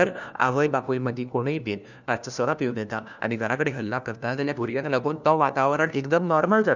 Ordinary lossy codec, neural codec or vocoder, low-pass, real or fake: none; codec, 16 kHz, 1 kbps, FunCodec, trained on LibriTTS, 50 frames a second; 7.2 kHz; fake